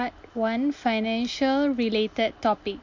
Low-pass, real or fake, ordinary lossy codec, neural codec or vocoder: 7.2 kHz; real; MP3, 48 kbps; none